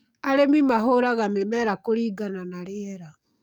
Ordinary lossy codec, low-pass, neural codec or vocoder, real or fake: none; 19.8 kHz; codec, 44.1 kHz, 7.8 kbps, DAC; fake